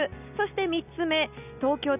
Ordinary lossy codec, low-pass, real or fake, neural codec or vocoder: none; 3.6 kHz; real; none